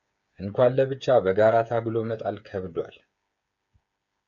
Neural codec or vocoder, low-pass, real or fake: codec, 16 kHz, 8 kbps, FreqCodec, smaller model; 7.2 kHz; fake